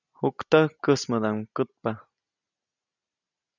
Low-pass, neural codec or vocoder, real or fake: 7.2 kHz; none; real